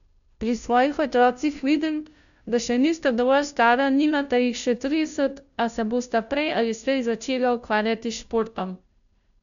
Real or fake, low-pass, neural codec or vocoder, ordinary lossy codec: fake; 7.2 kHz; codec, 16 kHz, 0.5 kbps, FunCodec, trained on Chinese and English, 25 frames a second; none